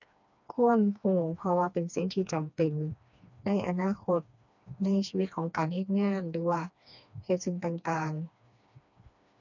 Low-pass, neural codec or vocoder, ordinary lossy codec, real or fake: 7.2 kHz; codec, 16 kHz, 2 kbps, FreqCodec, smaller model; none; fake